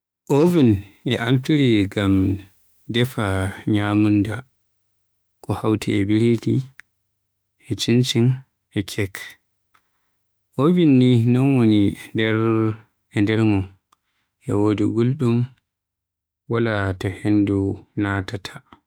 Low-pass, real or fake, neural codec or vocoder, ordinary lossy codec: none; fake; autoencoder, 48 kHz, 32 numbers a frame, DAC-VAE, trained on Japanese speech; none